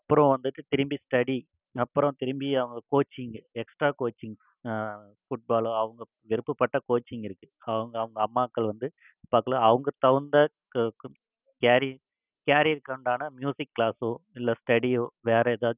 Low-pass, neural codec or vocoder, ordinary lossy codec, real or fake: 3.6 kHz; none; none; real